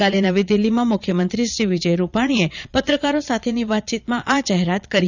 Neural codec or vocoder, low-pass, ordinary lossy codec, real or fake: vocoder, 22.05 kHz, 80 mel bands, Vocos; 7.2 kHz; none; fake